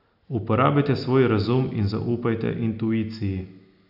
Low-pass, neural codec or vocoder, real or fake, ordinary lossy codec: 5.4 kHz; none; real; AAC, 48 kbps